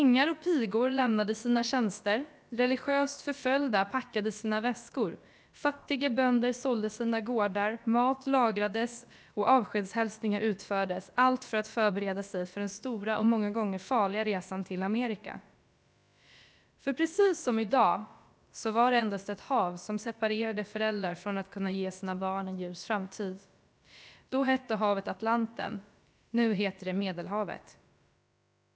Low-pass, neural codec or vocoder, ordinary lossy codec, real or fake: none; codec, 16 kHz, about 1 kbps, DyCAST, with the encoder's durations; none; fake